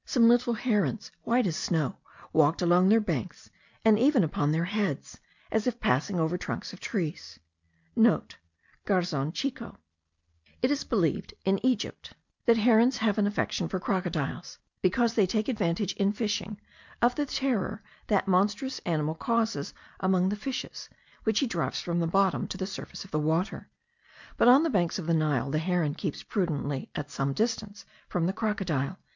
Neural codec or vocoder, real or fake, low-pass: none; real; 7.2 kHz